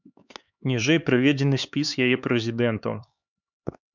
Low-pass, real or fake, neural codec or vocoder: 7.2 kHz; fake; codec, 16 kHz, 4 kbps, X-Codec, HuBERT features, trained on LibriSpeech